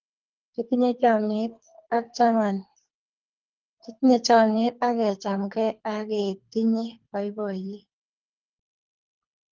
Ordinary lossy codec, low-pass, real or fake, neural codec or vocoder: Opus, 32 kbps; 7.2 kHz; fake; codec, 24 kHz, 3 kbps, HILCodec